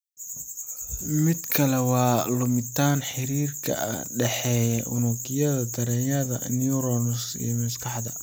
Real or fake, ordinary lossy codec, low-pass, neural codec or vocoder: real; none; none; none